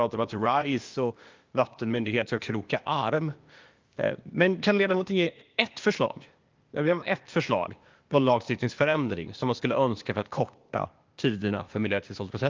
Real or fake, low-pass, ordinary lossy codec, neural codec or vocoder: fake; 7.2 kHz; Opus, 32 kbps; codec, 16 kHz, 0.8 kbps, ZipCodec